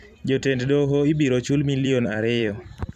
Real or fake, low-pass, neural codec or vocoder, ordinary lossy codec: fake; 14.4 kHz; vocoder, 44.1 kHz, 128 mel bands every 256 samples, BigVGAN v2; none